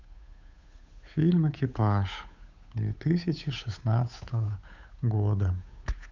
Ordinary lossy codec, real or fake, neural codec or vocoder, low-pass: none; fake; codec, 16 kHz, 8 kbps, FunCodec, trained on Chinese and English, 25 frames a second; 7.2 kHz